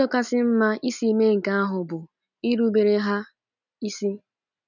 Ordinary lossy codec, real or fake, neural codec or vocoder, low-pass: none; real; none; 7.2 kHz